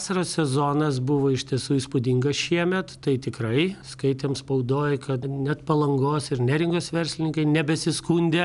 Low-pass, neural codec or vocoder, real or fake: 10.8 kHz; none; real